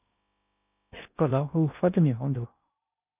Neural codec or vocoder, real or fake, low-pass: codec, 16 kHz in and 24 kHz out, 0.6 kbps, FocalCodec, streaming, 2048 codes; fake; 3.6 kHz